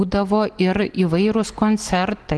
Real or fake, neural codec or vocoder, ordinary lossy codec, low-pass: real; none; Opus, 16 kbps; 10.8 kHz